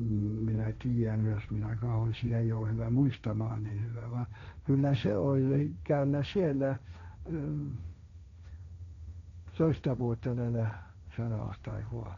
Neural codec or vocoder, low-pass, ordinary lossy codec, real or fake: codec, 16 kHz, 1.1 kbps, Voila-Tokenizer; 7.2 kHz; none; fake